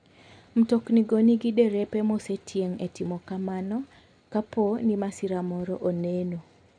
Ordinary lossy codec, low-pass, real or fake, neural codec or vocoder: none; 9.9 kHz; real; none